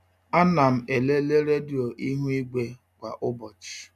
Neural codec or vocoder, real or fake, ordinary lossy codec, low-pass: none; real; none; 14.4 kHz